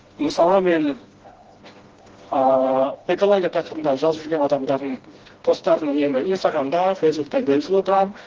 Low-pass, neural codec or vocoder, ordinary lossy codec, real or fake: 7.2 kHz; codec, 16 kHz, 1 kbps, FreqCodec, smaller model; Opus, 16 kbps; fake